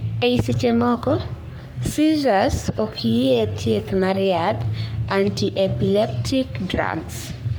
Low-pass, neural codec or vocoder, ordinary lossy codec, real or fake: none; codec, 44.1 kHz, 3.4 kbps, Pupu-Codec; none; fake